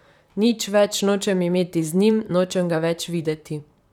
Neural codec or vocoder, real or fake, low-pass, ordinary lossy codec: vocoder, 44.1 kHz, 128 mel bands, Pupu-Vocoder; fake; 19.8 kHz; none